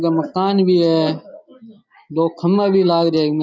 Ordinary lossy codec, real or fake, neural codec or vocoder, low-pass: none; real; none; none